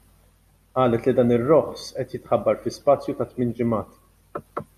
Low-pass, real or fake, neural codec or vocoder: 14.4 kHz; real; none